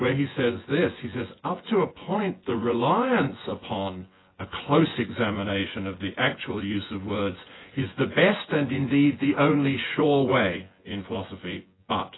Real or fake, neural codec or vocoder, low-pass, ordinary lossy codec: fake; vocoder, 24 kHz, 100 mel bands, Vocos; 7.2 kHz; AAC, 16 kbps